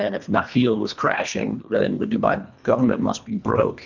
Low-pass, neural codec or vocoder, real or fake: 7.2 kHz; codec, 24 kHz, 1.5 kbps, HILCodec; fake